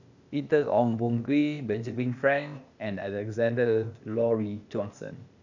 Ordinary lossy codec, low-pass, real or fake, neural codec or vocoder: none; 7.2 kHz; fake; codec, 16 kHz, 0.8 kbps, ZipCodec